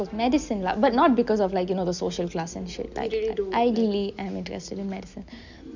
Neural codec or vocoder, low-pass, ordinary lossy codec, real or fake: none; 7.2 kHz; none; real